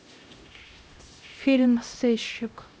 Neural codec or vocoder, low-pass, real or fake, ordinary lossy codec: codec, 16 kHz, 0.5 kbps, X-Codec, HuBERT features, trained on LibriSpeech; none; fake; none